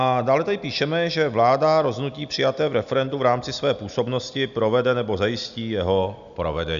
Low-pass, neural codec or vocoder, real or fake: 7.2 kHz; none; real